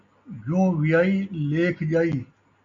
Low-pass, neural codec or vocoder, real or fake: 7.2 kHz; none; real